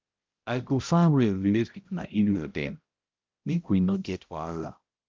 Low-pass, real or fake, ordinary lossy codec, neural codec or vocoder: 7.2 kHz; fake; Opus, 24 kbps; codec, 16 kHz, 0.5 kbps, X-Codec, HuBERT features, trained on balanced general audio